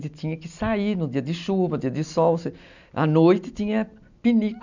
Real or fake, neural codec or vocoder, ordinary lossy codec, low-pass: real; none; none; 7.2 kHz